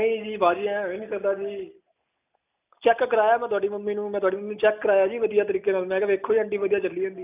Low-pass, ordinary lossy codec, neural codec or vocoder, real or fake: 3.6 kHz; none; none; real